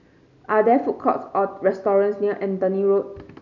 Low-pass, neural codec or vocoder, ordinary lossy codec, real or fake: 7.2 kHz; none; none; real